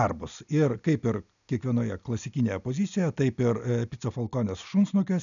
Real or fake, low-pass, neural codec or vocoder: real; 7.2 kHz; none